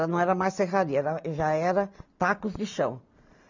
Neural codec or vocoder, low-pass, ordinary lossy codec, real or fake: none; 7.2 kHz; none; real